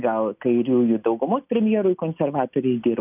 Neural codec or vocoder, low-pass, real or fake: none; 3.6 kHz; real